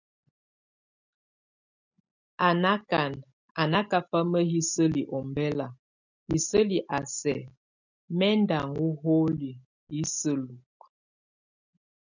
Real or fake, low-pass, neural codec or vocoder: real; 7.2 kHz; none